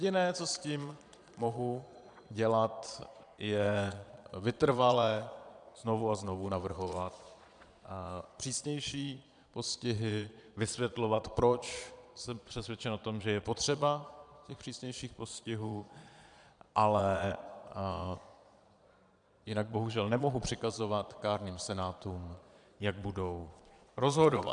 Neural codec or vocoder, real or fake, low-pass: vocoder, 22.05 kHz, 80 mel bands, Vocos; fake; 9.9 kHz